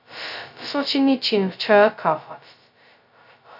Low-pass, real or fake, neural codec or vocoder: 5.4 kHz; fake; codec, 16 kHz, 0.2 kbps, FocalCodec